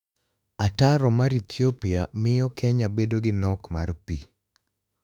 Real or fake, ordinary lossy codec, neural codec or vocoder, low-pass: fake; none; autoencoder, 48 kHz, 32 numbers a frame, DAC-VAE, trained on Japanese speech; 19.8 kHz